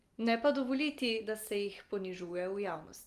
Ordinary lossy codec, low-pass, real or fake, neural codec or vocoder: Opus, 24 kbps; 14.4 kHz; real; none